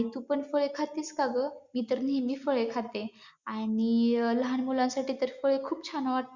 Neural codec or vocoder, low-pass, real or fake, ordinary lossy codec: none; 7.2 kHz; real; Opus, 64 kbps